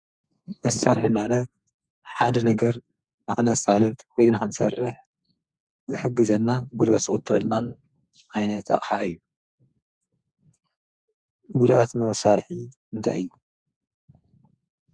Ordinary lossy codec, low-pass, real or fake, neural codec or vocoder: Opus, 64 kbps; 9.9 kHz; fake; codec, 32 kHz, 1.9 kbps, SNAC